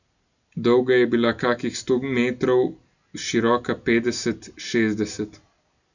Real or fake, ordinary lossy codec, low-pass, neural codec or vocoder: real; none; 7.2 kHz; none